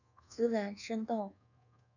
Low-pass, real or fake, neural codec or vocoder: 7.2 kHz; fake; codec, 24 kHz, 1.2 kbps, DualCodec